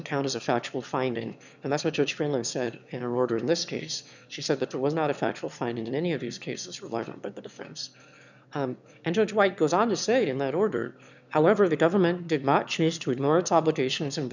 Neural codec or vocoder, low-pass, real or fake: autoencoder, 22.05 kHz, a latent of 192 numbers a frame, VITS, trained on one speaker; 7.2 kHz; fake